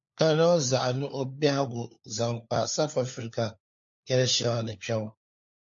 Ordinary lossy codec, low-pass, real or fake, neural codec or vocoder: MP3, 48 kbps; 7.2 kHz; fake; codec, 16 kHz, 4 kbps, FunCodec, trained on LibriTTS, 50 frames a second